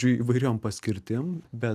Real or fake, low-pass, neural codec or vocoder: real; 14.4 kHz; none